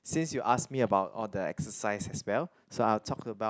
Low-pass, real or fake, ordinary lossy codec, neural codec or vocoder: none; real; none; none